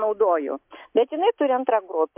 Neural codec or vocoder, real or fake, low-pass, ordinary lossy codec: none; real; 3.6 kHz; MP3, 32 kbps